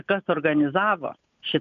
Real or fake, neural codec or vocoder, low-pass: real; none; 7.2 kHz